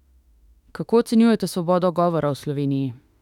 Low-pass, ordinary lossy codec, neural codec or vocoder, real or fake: 19.8 kHz; none; autoencoder, 48 kHz, 32 numbers a frame, DAC-VAE, trained on Japanese speech; fake